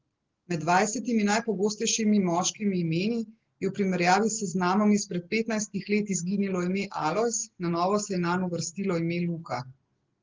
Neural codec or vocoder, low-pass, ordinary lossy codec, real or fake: none; 7.2 kHz; Opus, 16 kbps; real